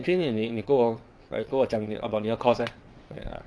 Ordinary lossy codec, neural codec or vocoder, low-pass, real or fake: none; vocoder, 22.05 kHz, 80 mel bands, WaveNeXt; none; fake